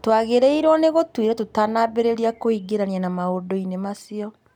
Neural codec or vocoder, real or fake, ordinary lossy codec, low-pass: none; real; none; 19.8 kHz